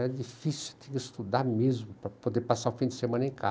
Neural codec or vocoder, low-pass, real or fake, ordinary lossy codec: none; none; real; none